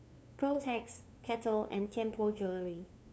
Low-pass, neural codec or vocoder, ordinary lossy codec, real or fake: none; codec, 16 kHz, 2 kbps, FunCodec, trained on LibriTTS, 25 frames a second; none; fake